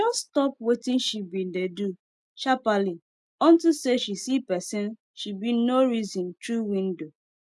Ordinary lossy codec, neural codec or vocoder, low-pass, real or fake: none; none; none; real